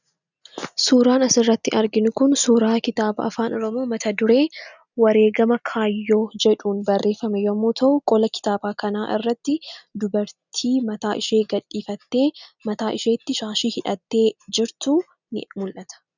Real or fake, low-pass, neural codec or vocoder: real; 7.2 kHz; none